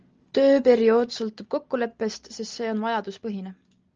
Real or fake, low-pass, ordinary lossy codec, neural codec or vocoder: real; 7.2 kHz; Opus, 24 kbps; none